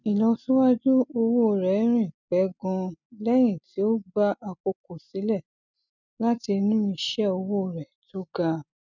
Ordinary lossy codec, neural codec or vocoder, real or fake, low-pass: none; none; real; 7.2 kHz